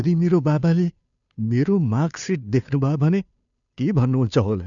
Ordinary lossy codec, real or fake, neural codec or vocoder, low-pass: none; fake; codec, 16 kHz, 2 kbps, FunCodec, trained on Chinese and English, 25 frames a second; 7.2 kHz